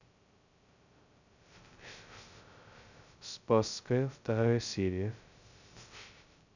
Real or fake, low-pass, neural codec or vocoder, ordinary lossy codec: fake; 7.2 kHz; codec, 16 kHz, 0.2 kbps, FocalCodec; none